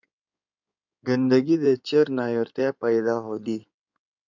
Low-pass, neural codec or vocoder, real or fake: 7.2 kHz; codec, 16 kHz in and 24 kHz out, 2.2 kbps, FireRedTTS-2 codec; fake